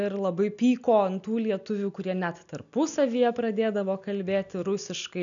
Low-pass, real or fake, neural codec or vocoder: 7.2 kHz; real; none